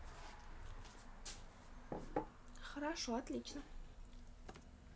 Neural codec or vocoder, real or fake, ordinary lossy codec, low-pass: none; real; none; none